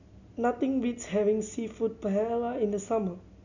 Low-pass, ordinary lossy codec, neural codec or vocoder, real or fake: 7.2 kHz; none; none; real